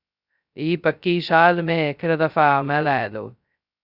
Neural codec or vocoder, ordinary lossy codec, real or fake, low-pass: codec, 16 kHz, 0.2 kbps, FocalCodec; Opus, 64 kbps; fake; 5.4 kHz